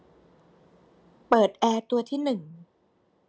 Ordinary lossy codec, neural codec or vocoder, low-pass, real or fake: none; none; none; real